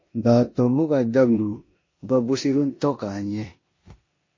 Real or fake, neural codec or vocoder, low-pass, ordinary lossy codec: fake; codec, 16 kHz in and 24 kHz out, 0.9 kbps, LongCat-Audio-Codec, four codebook decoder; 7.2 kHz; MP3, 32 kbps